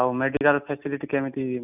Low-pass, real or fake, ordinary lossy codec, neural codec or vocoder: 3.6 kHz; real; none; none